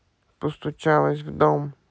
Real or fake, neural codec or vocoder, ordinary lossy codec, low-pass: real; none; none; none